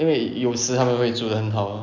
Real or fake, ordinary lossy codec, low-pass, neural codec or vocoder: real; none; 7.2 kHz; none